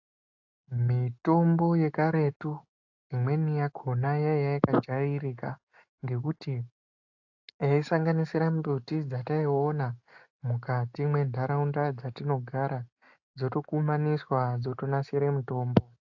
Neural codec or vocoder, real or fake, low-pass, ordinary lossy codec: none; real; 7.2 kHz; AAC, 48 kbps